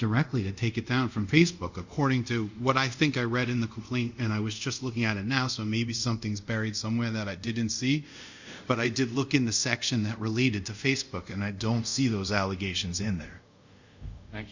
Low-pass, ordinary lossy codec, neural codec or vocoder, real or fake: 7.2 kHz; Opus, 64 kbps; codec, 24 kHz, 0.5 kbps, DualCodec; fake